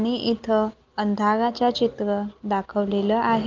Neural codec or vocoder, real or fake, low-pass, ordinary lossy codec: none; real; 7.2 kHz; Opus, 24 kbps